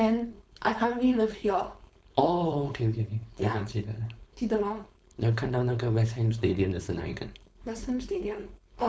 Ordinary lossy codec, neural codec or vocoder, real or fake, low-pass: none; codec, 16 kHz, 4.8 kbps, FACodec; fake; none